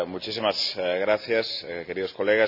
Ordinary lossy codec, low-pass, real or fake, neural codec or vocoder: none; 5.4 kHz; real; none